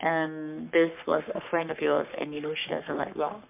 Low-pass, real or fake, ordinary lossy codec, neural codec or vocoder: 3.6 kHz; fake; MP3, 32 kbps; codec, 44.1 kHz, 3.4 kbps, Pupu-Codec